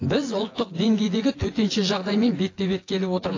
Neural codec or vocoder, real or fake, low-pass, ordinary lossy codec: vocoder, 24 kHz, 100 mel bands, Vocos; fake; 7.2 kHz; AAC, 32 kbps